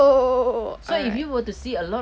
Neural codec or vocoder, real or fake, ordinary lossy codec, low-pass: none; real; none; none